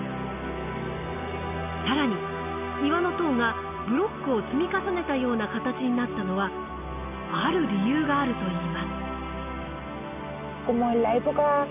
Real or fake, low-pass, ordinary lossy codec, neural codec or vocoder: real; 3.6 kHz; none; none